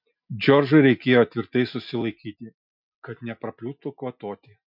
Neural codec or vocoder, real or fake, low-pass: none; real; 5.4 kHz